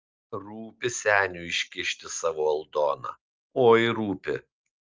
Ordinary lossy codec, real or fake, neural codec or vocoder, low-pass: Opus, 24 kbps; real; none; 7.2 kHz